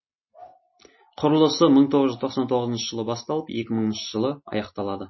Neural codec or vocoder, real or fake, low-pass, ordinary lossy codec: none; real; 7.2 kHz; MP3, 24 kbps